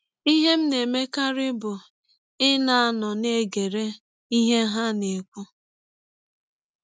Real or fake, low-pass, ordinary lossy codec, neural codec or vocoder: real; none; none; none